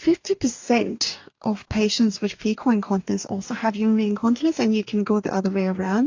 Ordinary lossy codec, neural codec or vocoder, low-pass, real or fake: AAC, 48 kbps; codec, 44.1 kHz, 2.6 kbps, DAC; 7.2 kHz; fake